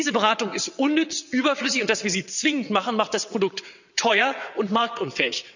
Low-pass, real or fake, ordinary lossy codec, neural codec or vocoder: 7.2 kHz; fake; none; vocoder, 22.05 kHz, 80 mel bands, WaveNeXt